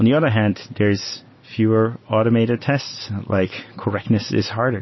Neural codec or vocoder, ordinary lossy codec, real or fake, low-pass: none; MP3, 24 kbps; real; 7.2 kHz